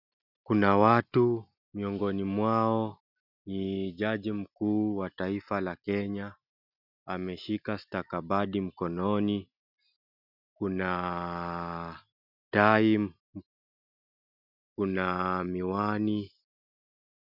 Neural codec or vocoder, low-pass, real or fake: none; 5.4 kHz; real